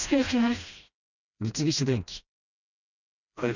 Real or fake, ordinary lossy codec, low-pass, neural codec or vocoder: fake; none; 7.2 kHz; codec, 16 kHz, 1 kbps, FreqCodec, smaller model